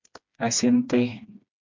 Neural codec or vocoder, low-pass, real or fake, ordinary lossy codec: codec, 16 kHz, 2 kbps, FreqCodec, smaller model; 7.2 kHz; fake; MP3, 64 kbps